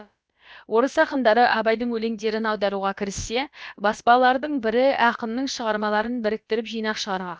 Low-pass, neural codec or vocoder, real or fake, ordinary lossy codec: none; codec, 16 kHz, about 1 kbps, DyCAST, with the encoder's durations; fake; none